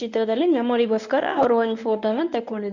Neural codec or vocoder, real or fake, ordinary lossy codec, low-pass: codec, 24 kHz, 0.9 kbps, WavTokenizer, medium speech release version 1; fake; none; 7.2 kHz